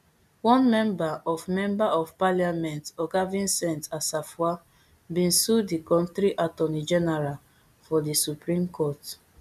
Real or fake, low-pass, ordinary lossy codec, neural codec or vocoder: real; 14.4 kHz; none; none